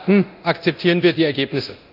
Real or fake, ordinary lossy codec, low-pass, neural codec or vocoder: fake; none; 5.4 kHz; codec, 24 kHz, 0.9 kbps, DualCodec